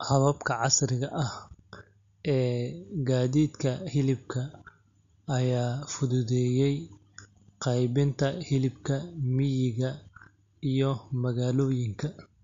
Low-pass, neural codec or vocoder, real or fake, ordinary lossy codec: 7.2 kHz; none; real; MP3, 48 kbps